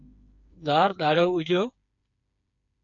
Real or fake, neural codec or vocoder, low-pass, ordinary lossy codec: fake; codec, 16 kHz, 4 kbps, FreqCodec, smaller model; 7.2 kHz; MP3, 64 kbps